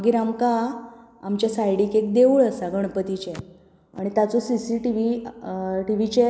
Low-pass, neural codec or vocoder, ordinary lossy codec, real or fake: none; none; none; real